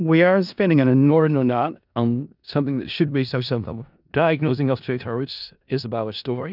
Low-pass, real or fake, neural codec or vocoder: 5.4 kHz; fake; codec, 16 kHz in and 24 kHz out, 0.4 kbps, LongCat-Audio-Codec, four codebook decoder